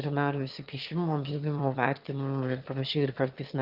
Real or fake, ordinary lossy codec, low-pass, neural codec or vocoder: fake; Opus, 24 kbps; 5.4 kHz; autoencoder, 22.05 kHz, a latent of 192 numbers a frame, VITS, trained on one speaker